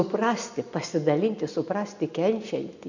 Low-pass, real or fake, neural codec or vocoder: 7.2 kHz; real; none